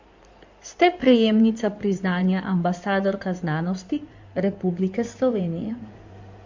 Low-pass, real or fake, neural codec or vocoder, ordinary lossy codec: 7.2 kHz; fake; codec, 16 kHz in and 24 kHz out, 2.2 kbps, FireRedTTS-2 codec; MP3, 48 kbps